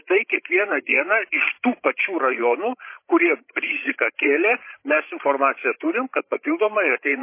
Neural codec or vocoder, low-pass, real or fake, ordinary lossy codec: codec, 16 kHz, 16 kbps, FreqCodec, larger model; 3.6 kHz; fake; MP3, 24 kbps